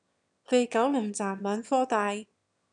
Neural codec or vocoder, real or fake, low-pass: autoencoder, 22.05 kHz, a latent of 192 numbers a frame, VITS, trained on one speaker; fake; 9.9 kHz